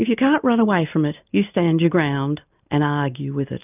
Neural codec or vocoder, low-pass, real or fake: none; 3.6 kHz; real